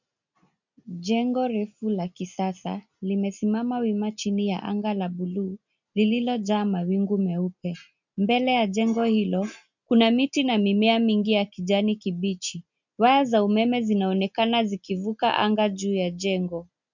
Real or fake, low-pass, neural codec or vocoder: real; 7.2 kHz; none